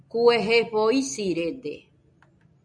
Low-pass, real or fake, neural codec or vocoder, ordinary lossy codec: 9.9 kHz; real; none; AAC, 64 kbps